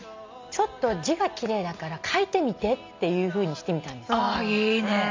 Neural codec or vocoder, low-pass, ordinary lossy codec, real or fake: none; 7.2 kHz; MP3, 64 kbps; real